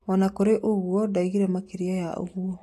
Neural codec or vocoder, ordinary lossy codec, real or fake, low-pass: none; AAC, 48 kbps; real; 14.4 kHz